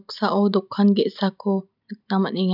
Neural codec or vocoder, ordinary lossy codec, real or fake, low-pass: none; none; real; 5.4 kHz